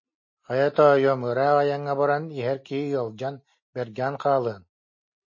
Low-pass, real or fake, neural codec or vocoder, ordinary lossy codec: 7.2 kHz; real; none; MP3, 32 kbps